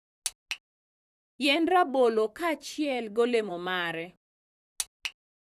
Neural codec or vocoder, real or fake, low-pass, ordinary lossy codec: vocoder, 44.1 kHz, 128 mel bands, Pupu-Vocoder; fake; 14.4 kHz; none